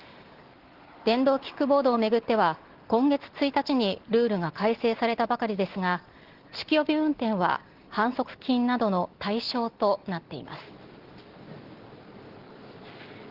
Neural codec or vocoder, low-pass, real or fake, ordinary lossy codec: none; 5.4 kHz; real; Opus, 16 kbps